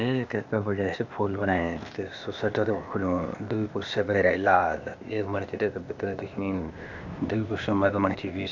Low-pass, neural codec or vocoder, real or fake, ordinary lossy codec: 7.2 kHz; codec, 16 kHz, 0.8 kbps, ZipCodec; fake; none